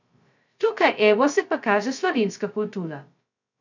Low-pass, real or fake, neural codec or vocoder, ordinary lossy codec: 7.2 kHz; fake; codec, 16 kHz, 0.2 kbps, FocalCodec; none